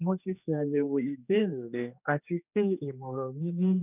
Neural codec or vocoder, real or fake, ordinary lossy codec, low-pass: codec, 16 kHz, 2 kbps, X-Codec, HuBERT features, trained on general audio; fake; none; 3.6 kHz